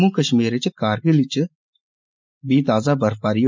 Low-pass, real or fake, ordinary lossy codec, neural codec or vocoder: 7.2 kHz; real; MP3, 64 kbps; none